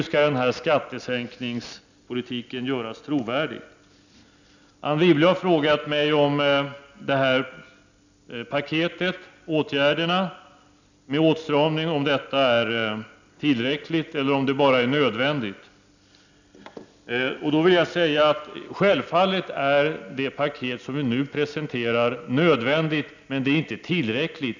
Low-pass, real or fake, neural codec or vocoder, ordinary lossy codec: 7.2 kHz; real; none; none